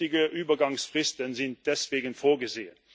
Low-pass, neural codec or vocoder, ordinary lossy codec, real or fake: none; none; none; real